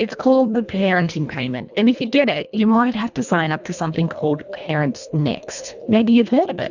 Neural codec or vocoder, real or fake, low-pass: codec, 24 kHz, 1.5 kbps, HILCodec; fake; 7.2 kHz